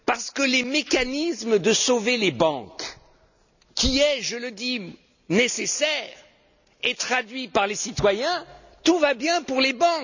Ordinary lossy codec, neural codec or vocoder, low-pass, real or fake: none; none; 7.2 kHz; real